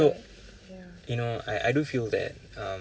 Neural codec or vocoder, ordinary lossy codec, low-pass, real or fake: none; none; none; real